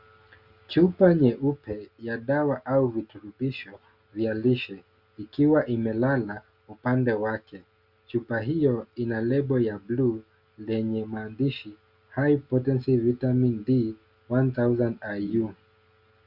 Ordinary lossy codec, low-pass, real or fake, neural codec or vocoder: Opus, 64 kbps; 5.4 kHz; real; none